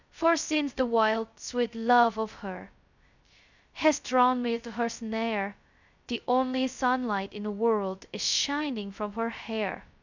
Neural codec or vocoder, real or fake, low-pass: codec, 16 kHz, 0.2 kbps, FocalCodec; fake; 7.2 kHz